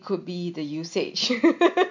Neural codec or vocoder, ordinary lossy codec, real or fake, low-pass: none; MP3, 48 kbps; real; 7.2 kHz